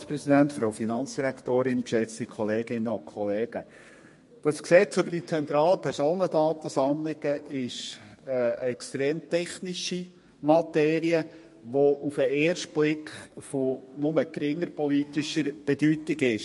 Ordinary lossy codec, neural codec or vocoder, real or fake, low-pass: MP3, 48 kbps; codec, 32 kHz, 1.9 kbps, SNAC; fake; 14.4 kHz